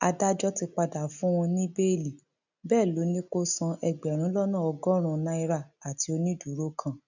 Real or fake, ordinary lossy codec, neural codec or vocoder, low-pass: real; none; none; 7.2 kHz